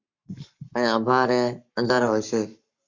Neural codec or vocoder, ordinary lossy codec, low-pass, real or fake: codec, 44.1 kHz, 7.8 kbps, Pupu-Codec; Opus, 64 kbps; 7.2 kHz; fake